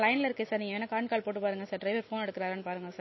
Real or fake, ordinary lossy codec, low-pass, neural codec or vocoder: real; MP3, 24 kbps; 7.2 kHz; none